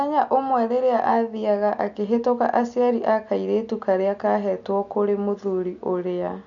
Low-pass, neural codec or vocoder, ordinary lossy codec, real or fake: 7.2 kHz; none; none; real